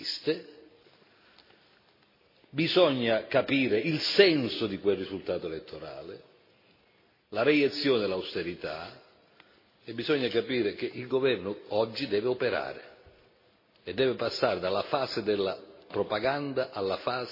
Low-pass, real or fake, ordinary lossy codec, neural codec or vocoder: 5.4 kHz; real; MP3, 24 kbps; none